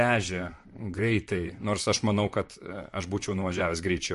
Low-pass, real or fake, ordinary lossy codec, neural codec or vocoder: 14.4 kHz; fake; MP3, 48 kbps; vocoder, 44.1 kHz, 128 mel bands, Pupu-Vocoder